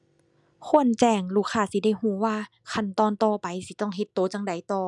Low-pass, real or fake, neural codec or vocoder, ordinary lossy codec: 10.8 kHz; real; none; none